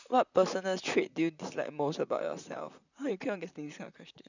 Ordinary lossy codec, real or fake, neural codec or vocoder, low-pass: none; fake; vocoder, 44.1 kHz, 128 mel bands, Pupu-Vocoder; 7.2 kHz